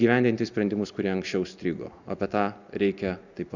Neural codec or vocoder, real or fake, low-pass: none; real; 7.2 kHz